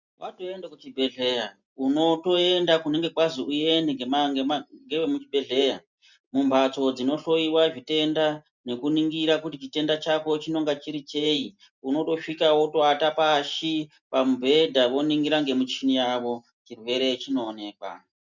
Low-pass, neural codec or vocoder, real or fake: 7.2 kHz; none; real